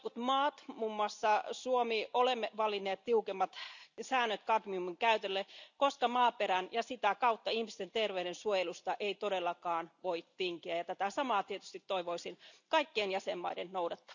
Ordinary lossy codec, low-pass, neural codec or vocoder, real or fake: none; 7.2 kHz; none; real